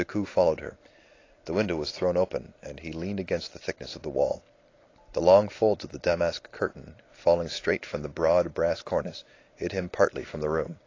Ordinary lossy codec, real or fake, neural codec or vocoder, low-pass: AAC, 32 kbps; real; none; 7.2 kHz